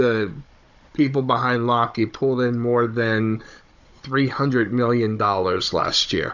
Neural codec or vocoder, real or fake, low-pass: codec, 16 kHz, 16 kbps, FunCodec, trained on Chinese and English, 50 frames a second; fake; 7.2 kHz